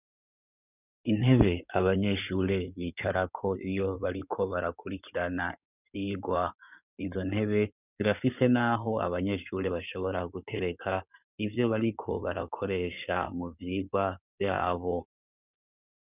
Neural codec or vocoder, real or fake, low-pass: codec, 16 kHz in and 24 kHz out, 2.2 kbps, FireRedTTS-2 codec; fake; 3.6 kHz